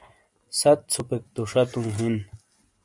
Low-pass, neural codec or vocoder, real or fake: 10.8 kHz; none; real